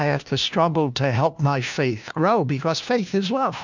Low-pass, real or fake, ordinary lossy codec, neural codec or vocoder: 7.2 kHz; fake; MP3, 64 kbps; codec, 16 kHz, 1 kbps, FunCodec, trained on LibriTTS, 50 frames a second